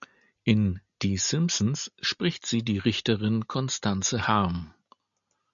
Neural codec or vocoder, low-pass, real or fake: none; 7.2 kHz; real